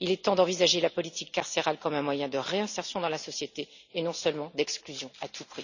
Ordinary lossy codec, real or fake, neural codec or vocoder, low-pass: none; real; none; 7.2 kHz